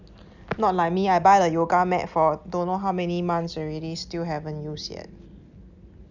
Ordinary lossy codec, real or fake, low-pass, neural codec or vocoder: none; real; 7.2 kHz; none